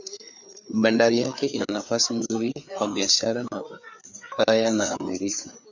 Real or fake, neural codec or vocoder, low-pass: fake; codec, 16 kHz, 4 kbps, FreqCodec, larger model; 7.2 kHz